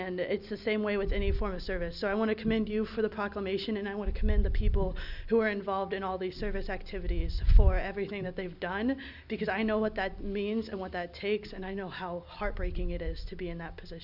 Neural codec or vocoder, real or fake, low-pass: none; real; 5.4 kHz